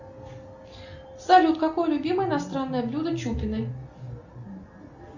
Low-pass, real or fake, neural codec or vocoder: 7.2 kHz; real; none